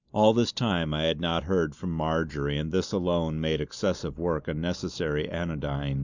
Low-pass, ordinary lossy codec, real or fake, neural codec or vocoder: 7.2 kHz; Opus, 64 kbps; real; none